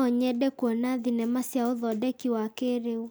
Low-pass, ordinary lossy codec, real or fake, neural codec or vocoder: none; none; real; none